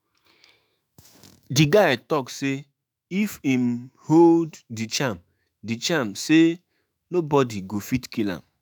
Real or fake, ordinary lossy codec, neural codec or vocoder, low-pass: fake; none; autoencoder, 48 kHz, 128 numbers a frame, DAC-VAE, trained on Japanese speech; none